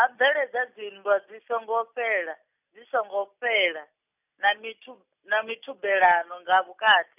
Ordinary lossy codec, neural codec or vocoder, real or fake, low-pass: none; none; real; 3.6 kHz